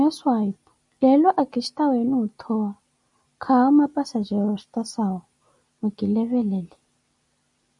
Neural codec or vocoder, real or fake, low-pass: none; real; 10.8 kHz